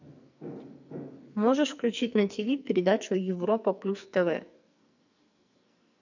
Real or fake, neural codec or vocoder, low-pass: fake; codec, 44.1 kHz, 2.6 kbps, SNAC; 7.2 kHz